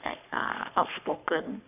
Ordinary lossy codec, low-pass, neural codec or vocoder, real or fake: none; 3.6 kHz; codec, 44.1 kHz, 3.4 kbps, Pupu-Codec; fake